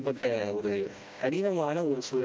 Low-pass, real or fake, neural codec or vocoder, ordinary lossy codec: none; fake; codec, 16 kHz, 1 kbps, FreqCodec, smaller model; none